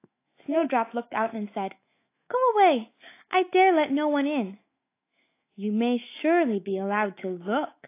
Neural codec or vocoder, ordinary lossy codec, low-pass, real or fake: autoencoder, 48 kHz, 128 numbers a frame, DAC-VAE, trained on Japanese speech; AAC, 24 kbps; 3.6 kHz; fake